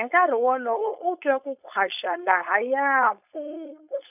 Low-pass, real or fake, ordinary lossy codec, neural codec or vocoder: 3.6 kHz; fake; none; codec, 16 kHz, 4.8 kbps, FACodec